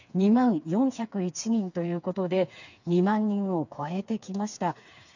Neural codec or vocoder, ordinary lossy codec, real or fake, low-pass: codec, 16 kHz, 4 kbps, FreqCodec, smaller model; none; fake; 7.2 kHz